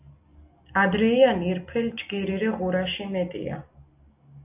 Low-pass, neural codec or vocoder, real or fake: 3.6 kHz; none; real